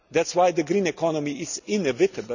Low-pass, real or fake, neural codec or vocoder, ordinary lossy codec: 7.2 kHz; real; none; none